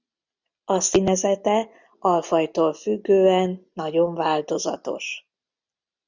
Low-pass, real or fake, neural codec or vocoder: 7.2 kHz; real; none